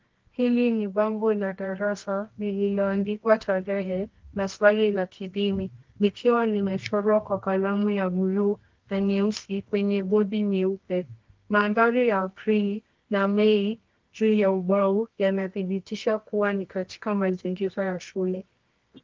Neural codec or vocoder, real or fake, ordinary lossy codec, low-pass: codec, 24 kHz, 0.9 kbps, WavTokenizer, medium music audio release; fake; Opus, 24 kbps; 7.2 kHz